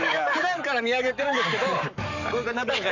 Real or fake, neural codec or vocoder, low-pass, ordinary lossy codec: fake; codec, 44.1 kHz, 7.8 kbps, Pupu-Codec; 7.2 kHz; none